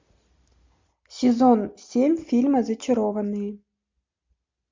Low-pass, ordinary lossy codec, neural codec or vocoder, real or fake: 7.2 kHz; MP3, 64 kbps; none; real